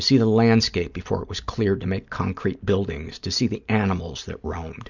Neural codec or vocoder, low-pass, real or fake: none; 7.2 kHz; real